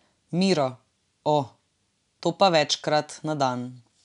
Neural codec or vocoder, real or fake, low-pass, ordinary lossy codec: none; real; 10.8 kHz; none